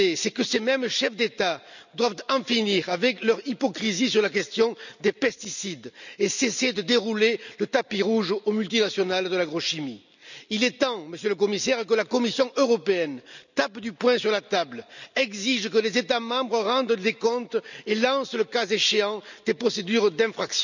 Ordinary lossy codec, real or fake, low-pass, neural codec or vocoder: none; real; 7.2 kHz; none